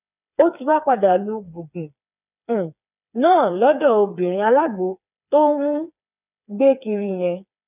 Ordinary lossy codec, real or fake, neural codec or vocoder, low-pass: none; fake; codec, 16 kHz, 4 kbps, FreqCodec, smaller model; 3.6 kHz